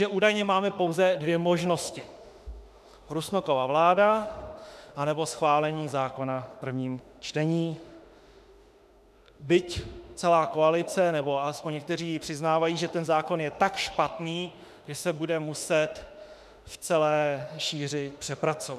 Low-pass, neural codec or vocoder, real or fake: 14.4 kHz; autoencoder, 48 kHz, 32 numbers a frame, DAC-VAE, trained on Japanese speech; fake